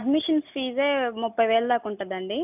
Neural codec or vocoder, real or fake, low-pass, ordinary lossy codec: none; real; 3.6 kHz; none